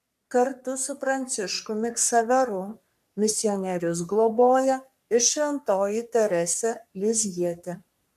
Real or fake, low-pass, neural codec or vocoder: fake; 14.4 kHz; codec, 44.1 kHz, 3.4 kbps, Pupu-Codec